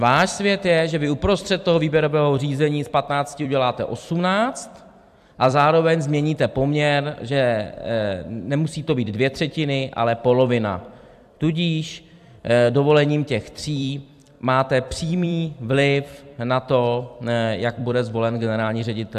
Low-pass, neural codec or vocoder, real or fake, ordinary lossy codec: 14.4 kHz; vocoder, 44.1 kHz, 128 mel bands every 256 samples, BigVGAN v2; fake; AAC, 96 kbps